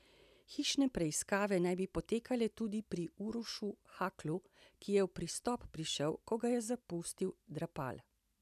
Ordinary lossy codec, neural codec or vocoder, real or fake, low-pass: none; none; real; 14.4 kHz